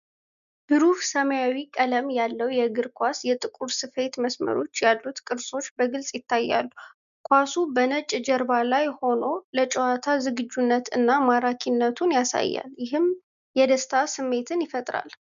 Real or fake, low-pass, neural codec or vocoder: real; 7.2 kHz; none